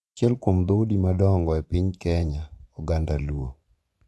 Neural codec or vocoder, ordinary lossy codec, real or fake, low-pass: vocoder, 24 kHz, 100 mel bands, Vocos; none; fake; none